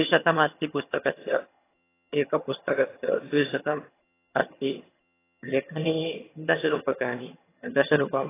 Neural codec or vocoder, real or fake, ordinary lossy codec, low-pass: vocoder, 22.05 kHz, 80 mel bands, HiFi-GAN; fake; AAC, 16 kbps; 3.6 kHz